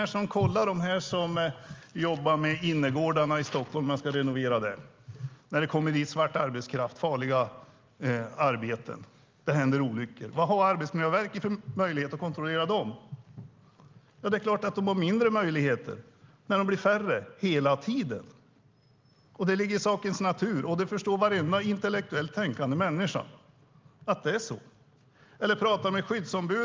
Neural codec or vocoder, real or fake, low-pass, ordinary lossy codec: none; real; 7.2 kHz; Opus, 32 kbps